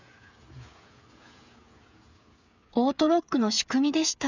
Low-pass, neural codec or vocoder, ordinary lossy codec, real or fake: 7.2 kHz; codec, 16 kHz, 8 kbps, FreqCodec, smaller model; none; fake